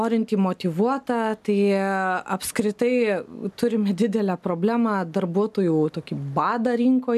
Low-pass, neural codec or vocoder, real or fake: 14.4 kHz; none; real